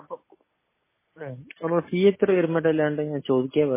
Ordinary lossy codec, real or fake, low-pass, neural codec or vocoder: MP3, 16 kbps; real; 3.6 kHz; none